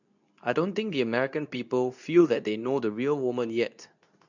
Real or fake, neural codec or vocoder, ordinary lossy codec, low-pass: fake; codec, 24 kHz, 0.9 kbps, WavTokenizer, medium speech release version 2; none; 7.2 kHz